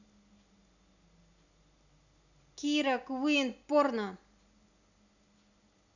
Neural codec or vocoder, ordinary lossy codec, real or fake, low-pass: none; none; real; 7.2 kHz